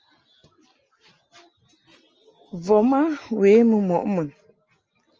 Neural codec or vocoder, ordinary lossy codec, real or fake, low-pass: none; Opus, 24 kbps; real; 7.2 kHz